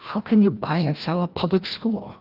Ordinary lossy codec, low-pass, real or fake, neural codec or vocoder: Opus, 24 kbps; 5.4 kHz; fake; codec, 16 kHz, 1 kbps, FreqCodec, larger model